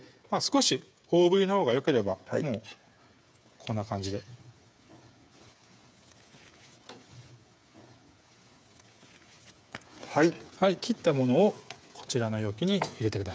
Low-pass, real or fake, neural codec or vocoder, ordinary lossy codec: none; fake; codec, 16 kHz, 8 kbps, FreqCodec, smaller model; none